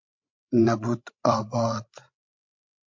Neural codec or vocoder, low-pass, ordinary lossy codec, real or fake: codec, 16 kHz, 16 kbps, FreqCodec, larger model; 7.2 kHz; MP3, 48 kbps; fake